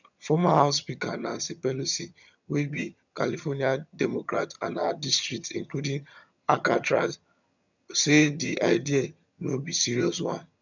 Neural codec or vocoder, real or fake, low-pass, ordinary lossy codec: vocoder, 22.05 kHz, 80 mel bands, HiFi-GAN; fake; 7.2 kHz; none